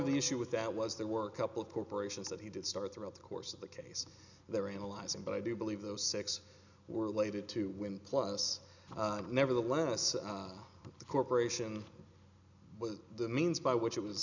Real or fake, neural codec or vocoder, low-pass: real; none; 7.2 kHz